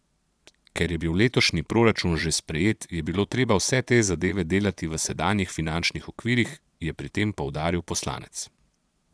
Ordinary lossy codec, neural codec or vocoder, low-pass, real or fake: none; vocoder, 22.05 kHz, 80 mel bands, Vocos; none; fake